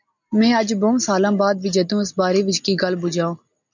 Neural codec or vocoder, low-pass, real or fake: none; 7.2 kHz; real